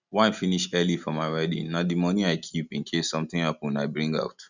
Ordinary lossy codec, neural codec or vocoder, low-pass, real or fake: none; none; 7.2 kHz; real